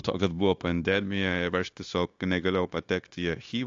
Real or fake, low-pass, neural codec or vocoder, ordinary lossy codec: fake; 7.2 kHz; codec, 16 kHz, 0.9 kbps, LongCat-Audio-Codec; AAC, 48 kbps